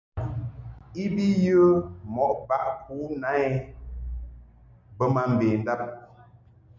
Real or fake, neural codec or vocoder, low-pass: real; none; 7.2 kHz